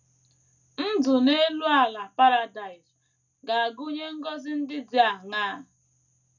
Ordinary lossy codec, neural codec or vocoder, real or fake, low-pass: none; none; real; 7.2 kHz